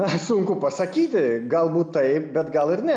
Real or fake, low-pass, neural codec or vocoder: real; 9.9 kHz; none